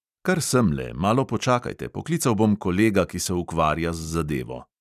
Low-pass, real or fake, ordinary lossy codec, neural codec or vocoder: 14.4 kHz; real; none; none